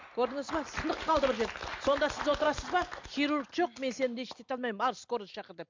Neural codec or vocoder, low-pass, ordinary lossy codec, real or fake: none; 7.2 kHz; MP3, 64 kbps; real